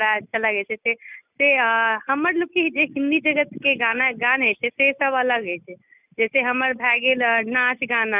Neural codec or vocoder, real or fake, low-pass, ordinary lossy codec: none; real; 3.6 kHz; none